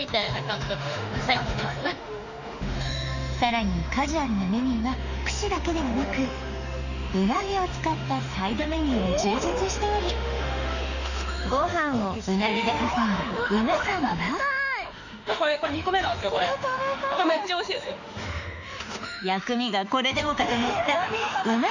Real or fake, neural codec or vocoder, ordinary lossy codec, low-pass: fake; autoencoder, 48 kHz, 32 numbers a frame, DAC-VAE, trained on Japanese speech; none; 7.2 kHz